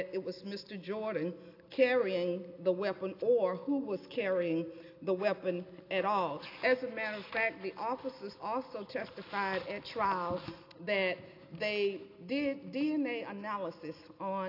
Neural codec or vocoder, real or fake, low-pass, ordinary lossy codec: none; real; 5.4 kHz; AAC, 32 kbps